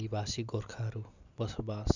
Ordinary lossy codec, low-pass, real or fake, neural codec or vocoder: none; 7.2 kHz; real; none